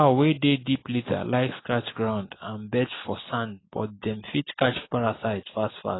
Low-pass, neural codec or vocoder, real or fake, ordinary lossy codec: 7.2 kHz; none; real; AAC, 16 kbps